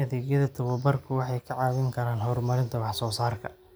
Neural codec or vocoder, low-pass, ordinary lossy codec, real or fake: none; none; none; real